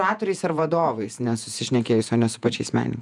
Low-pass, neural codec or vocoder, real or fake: 10.8 kHz; vocoder, 48 kHz, 128 mel bands, Vocos; fake